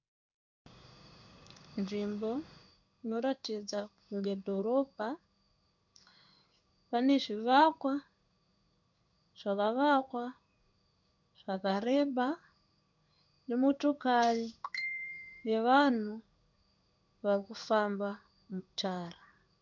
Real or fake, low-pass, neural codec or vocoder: fake; 7.2 kHz; codec, 16 kHz in and 24 kHz out, 1 kbps, XY-Tokenizer